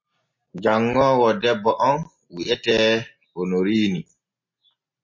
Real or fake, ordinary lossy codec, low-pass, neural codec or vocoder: real; MP3, 32 kbps; 7.2 kHz; none